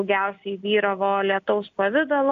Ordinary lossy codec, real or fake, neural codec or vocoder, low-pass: AAC, 64 kbps; real; none; 7.2 kHz